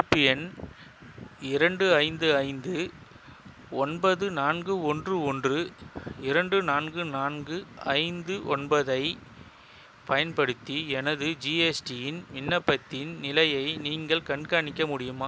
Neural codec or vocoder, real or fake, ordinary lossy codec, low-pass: none; real; none; none